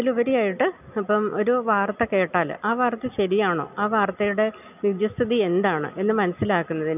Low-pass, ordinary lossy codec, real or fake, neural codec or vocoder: 3.6 kHz; none; real; none